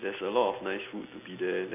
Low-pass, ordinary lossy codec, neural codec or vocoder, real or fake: 3.6 kHz; MP3, 24 kbps; none; real